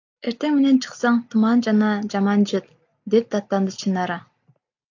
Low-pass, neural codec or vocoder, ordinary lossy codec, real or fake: 7.2 kHz; none; AAC, 48 kbps; real